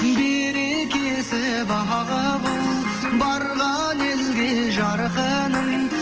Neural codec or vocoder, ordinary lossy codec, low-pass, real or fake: none; Opus, 16 kbps; 7.2 kHz; real